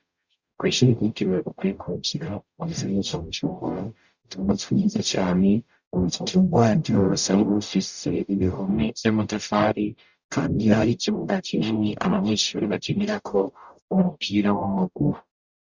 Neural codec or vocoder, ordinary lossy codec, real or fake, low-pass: codec, 44.1 kHz, 0.9 kbps, DAC; Opus, 64 kbps; fake; 7.2 kHz